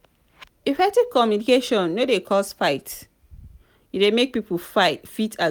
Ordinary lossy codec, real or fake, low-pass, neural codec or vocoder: none; real; none; none